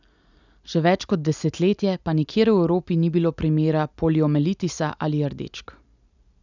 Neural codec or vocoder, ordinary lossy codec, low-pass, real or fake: none; none; 7.2 kHz; real